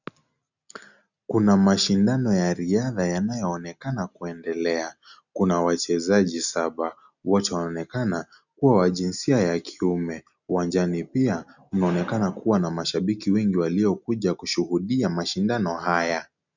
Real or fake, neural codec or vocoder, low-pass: real; none; 7.2 kHz